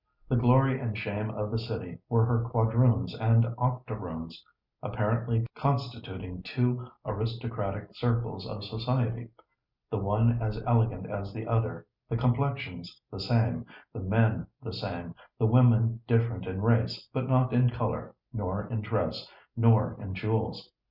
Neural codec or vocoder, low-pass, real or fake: none; 5.4 kHz; real